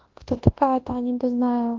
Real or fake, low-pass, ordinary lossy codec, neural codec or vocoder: fake; 7.2 kHz; Opus, 16 kbps; codec, 24 kHz, 0.9 kbps, WavTokenizer, large speech release